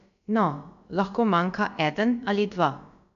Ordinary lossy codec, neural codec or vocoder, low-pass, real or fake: none; codec, 16 kHz, about 1 kbps, DyCAST, with the encoder's durations; 7.2 kHz; fake